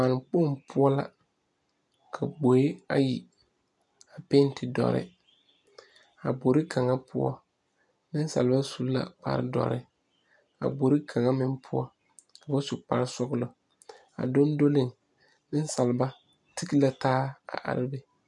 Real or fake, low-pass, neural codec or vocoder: real; 10.8 kHz; none